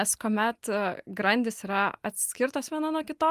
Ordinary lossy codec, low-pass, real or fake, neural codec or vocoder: Opus, 32 kbps; 14.4 kHz; real; none